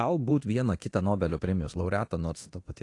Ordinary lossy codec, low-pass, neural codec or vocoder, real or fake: AAC, 48 kbps; 10.8 kHz; codec, 24 kHz, 0.9 kbps, DualCodec; fake